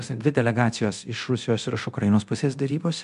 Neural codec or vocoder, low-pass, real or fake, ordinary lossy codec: codec, 24 kHz, 0.9 kbps, DualCodec; 10.8 kHz; fake; MP3, 64 kbps